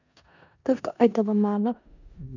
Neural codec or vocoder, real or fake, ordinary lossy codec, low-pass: codec, 16 kHz in and 24 kHz out, 0.4 kbps, LongCat-Audio-Codec, four codebook decoder; fake; none; 7.2 kHz